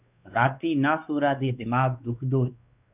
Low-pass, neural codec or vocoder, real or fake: 3.6 kHz; codec, 16 kHz, 2 kbps, X-Codec, WavLM features, trained on Multilingual LibriSpeech; fake